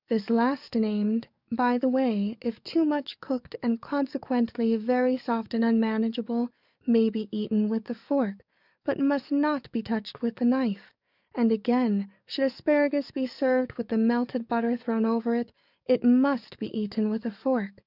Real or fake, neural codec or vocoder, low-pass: fake; codec, 44.1 kHz, 7.8 kbps, DAC; 5.4 kHz